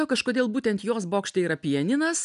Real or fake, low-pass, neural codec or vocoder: real; 10.8 kHz; none